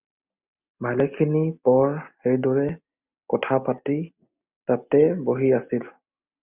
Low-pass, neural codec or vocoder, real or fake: 3.6 kHz; none; real